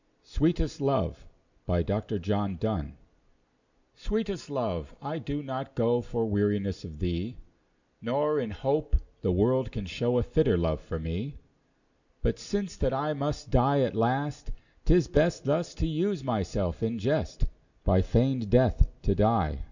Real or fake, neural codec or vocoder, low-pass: real; none; 7.2 kHz